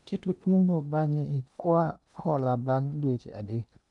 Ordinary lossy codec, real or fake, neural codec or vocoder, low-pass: none; fake; codec, 16 kHz in and 24 kHz out, 0.8 kbps, FocalCodec, streaming, 65536 codes; 10.8 kHz